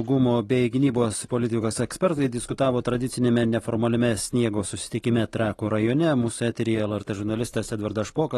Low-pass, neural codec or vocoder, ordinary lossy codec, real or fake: 19.8 kHz; none; AAC, 32 kbps; real